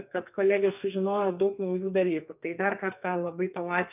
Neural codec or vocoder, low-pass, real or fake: codec, 44.1 kHz, 2.6 kbps, SNAC; 3.6 kHz; fake